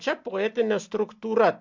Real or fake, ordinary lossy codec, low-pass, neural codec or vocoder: real; MP3, 64 kbps; 7.2 kHz; none